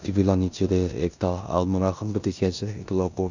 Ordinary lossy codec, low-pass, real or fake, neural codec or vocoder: none; 7.2 kHz; fake; codec, 16 kHz in and 24 kHz out, 0.9 kbps, LongCat-Audio-Codec, four codebook decoder